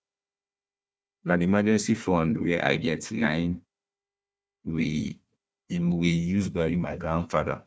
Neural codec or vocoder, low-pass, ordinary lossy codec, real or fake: codec, 16 kHz, 1 kbps, FunCodec, trained on Chinese and English, 50 frames a second; none; none; fake